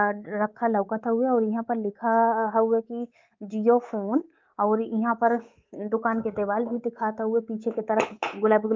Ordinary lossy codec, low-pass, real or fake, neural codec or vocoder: Opus, 24 kbps; 7.2 kHz; fake; codec, 24 kHz, 3.1 kbps, DualCodec